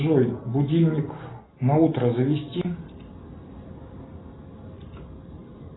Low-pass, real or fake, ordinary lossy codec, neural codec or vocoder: 7.2 kHz; real; AAC, 16 kbps; none